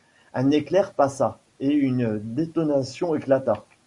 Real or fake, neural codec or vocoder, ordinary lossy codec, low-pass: real; none; Opus, 64 kbps; 10.8 kHz